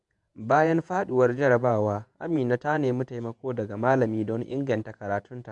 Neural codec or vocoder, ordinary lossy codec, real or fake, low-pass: vocoder, 48 kHz, 128 mel bands, Vocos; none; fake; 10.8 kHz